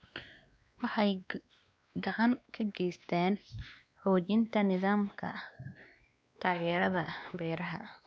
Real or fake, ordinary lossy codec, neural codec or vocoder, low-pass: fake; none; codec, 16 kHz, 2 kbps, X-Codec, WavLM features, trained on Multilingual LibriSpeech; none